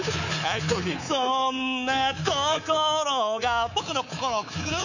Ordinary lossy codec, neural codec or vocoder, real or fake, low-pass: none; codec, 24 kHz, 3.1 kbps, DualCodec; fake; 7.2 kHz